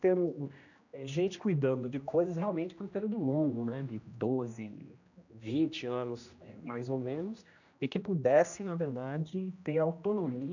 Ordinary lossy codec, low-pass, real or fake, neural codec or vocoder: none; 7.2 kHz; fake; codec, 16 kHz, 1 kbps, X-Codec, HuBERT features, trained on general audio